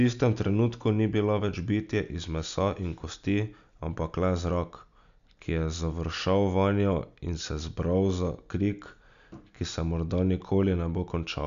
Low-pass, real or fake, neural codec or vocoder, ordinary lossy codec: 7.2 kHz; real; none; MP3, 96 kbps